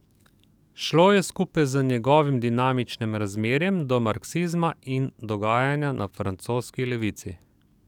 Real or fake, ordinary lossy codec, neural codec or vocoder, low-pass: fake; none; codec, 44.1 kHz, 7.8 kbps, DAC; 19.8 kHz